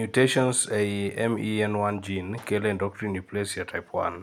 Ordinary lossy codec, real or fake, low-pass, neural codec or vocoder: none; real; 19.8 kHz; none